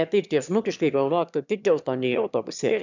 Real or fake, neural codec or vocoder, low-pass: fake; autoencoder, 22.05 kHz, a latent of 192 numbers a frame, VITS, trained on one speaker; 7.2 kHz